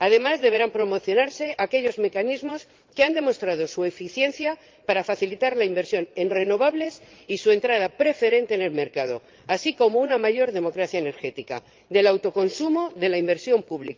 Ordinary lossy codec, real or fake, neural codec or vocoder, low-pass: Opus, 32 kbps; fake; vocoder, 22.05 kHz, 80 mel bands, Vocos; 7.2 kHz